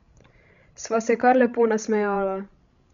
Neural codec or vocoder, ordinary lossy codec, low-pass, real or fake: codec, 16 kHz, 16 kbps, FreqCodec, larger model; none; 7.2 kHz; fake